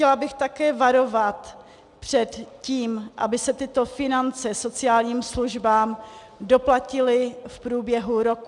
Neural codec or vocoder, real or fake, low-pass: none; real; 10.8 kHz